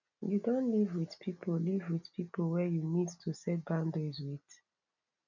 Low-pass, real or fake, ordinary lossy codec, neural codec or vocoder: 7.2 kHz; real; none; none